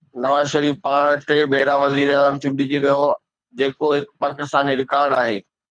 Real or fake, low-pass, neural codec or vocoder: fake; 9.9 kHz; codec, 24 kHz, 3 kbps, HILCodec